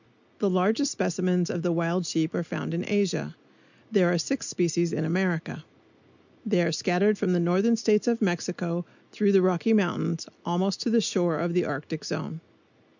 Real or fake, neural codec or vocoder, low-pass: real; none; 7.2 kHz